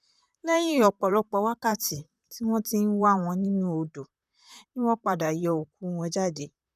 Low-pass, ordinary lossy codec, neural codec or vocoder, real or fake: 14.4 kHz; none; vocoder, 44.1 kHz, 128 mel bands, Pupu-Vocoder; fake